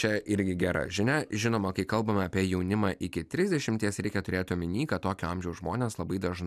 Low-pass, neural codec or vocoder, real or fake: 14.4 kHz; none; real